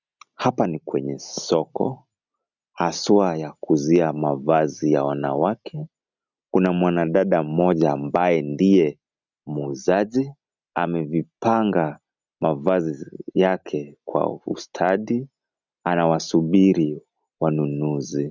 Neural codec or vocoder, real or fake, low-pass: none; real; 7.2 kHz